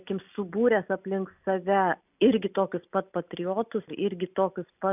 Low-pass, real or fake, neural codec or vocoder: 3.6 kHz; real; none